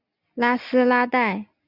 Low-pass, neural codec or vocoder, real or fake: 5.4 kHz; none; real